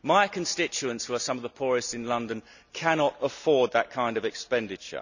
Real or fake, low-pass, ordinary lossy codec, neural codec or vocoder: real; 7.2 kHz; none; none